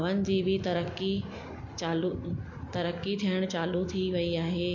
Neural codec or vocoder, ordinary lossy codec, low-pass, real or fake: none; MP3, 48 kbps; 7.2 kHz; real